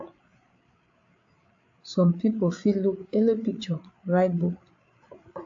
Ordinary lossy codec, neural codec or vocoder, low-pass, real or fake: AAC, 48 kbps; codec, 16 kHz, 8 kbps, FreqCodec, larger model; 7.2 kHz; fake